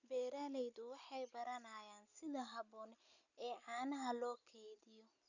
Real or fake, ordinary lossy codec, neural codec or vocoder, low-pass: real; none; none; 7.2 kHz